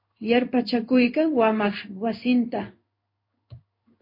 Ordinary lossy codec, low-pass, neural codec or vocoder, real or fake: MP3, 32 kbps; 5.4 kHz; codec, 16 kHz in and 24 kHz out, 1 kbps, XY-Tokenizer; fake